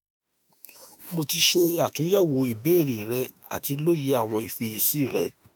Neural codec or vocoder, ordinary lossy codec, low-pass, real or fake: autoencoder, 48 kHz, 32 numbers a frame, DAC-VAE, trained on Japanese speech; none; none; fake